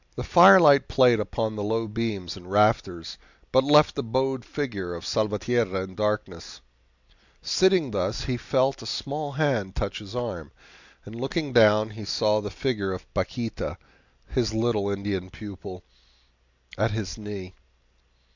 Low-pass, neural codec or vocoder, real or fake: 7.2 kHz; none; real